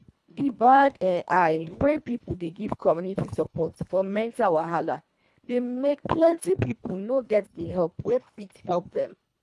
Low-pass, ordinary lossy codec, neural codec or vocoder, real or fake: none; none; codec, 24 kHz, 1.5 kbps, HILCodec; fake